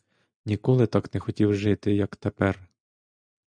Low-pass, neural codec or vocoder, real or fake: 9.9 kHz; none; real